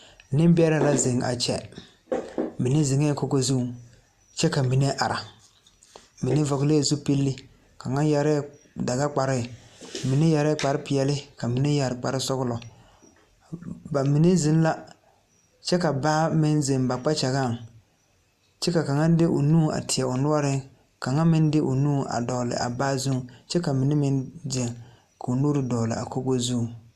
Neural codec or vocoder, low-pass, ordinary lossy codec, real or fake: none; 14.4 kHz; Opus, 64 kbps; real